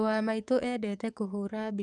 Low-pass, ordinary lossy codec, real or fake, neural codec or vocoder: 10.8 kHz; none; fake; codec, 44.1 kHz, 7.8 kbps, DAC